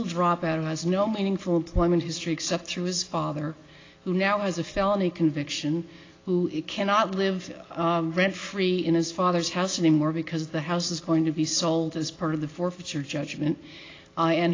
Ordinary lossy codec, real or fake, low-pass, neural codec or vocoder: AAC, 32 kbps; real; 7.2 kHz; none